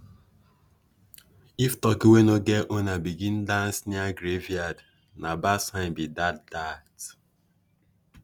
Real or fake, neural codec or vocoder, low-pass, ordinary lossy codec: real; none; none; none